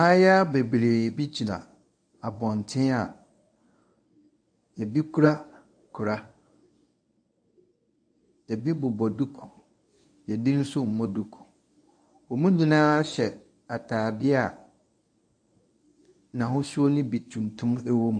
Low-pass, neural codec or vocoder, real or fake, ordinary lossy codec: 9.9 kHz; codec, 24 kHz, 0.9 kbps, WavTokenizer, medium speech release version 1; fake; AAC, 64 kbps